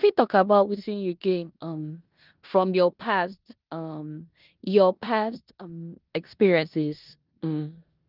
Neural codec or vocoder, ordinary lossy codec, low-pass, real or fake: codec, 16 kHz in and 24 kHz out, 0.9 kbps, LongCat-Audio-Codec, four codebook decoder; Opus, 32 kbps; 5.4 kHz; fake